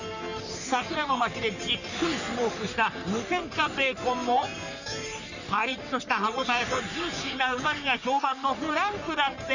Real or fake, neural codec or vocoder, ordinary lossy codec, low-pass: fake; codec, 44.1 kHz, 3.4 kbps, Pupu-Codec; none; 7.2 kHz